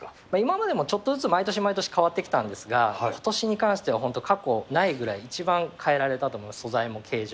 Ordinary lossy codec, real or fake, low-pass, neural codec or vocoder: none; real; none; none